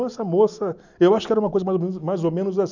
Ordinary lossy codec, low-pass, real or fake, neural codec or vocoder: none; 7.2 kHz; real; none